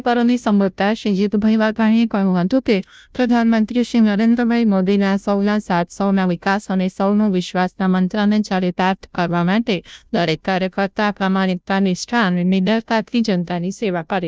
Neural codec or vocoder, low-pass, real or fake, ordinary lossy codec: codec, 16 kHz, 0.5 kbps, FunCodec, trained on Chinese and English, 25 frames a second; none; fake; none